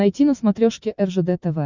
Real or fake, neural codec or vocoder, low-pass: real; none; 7.2 kHz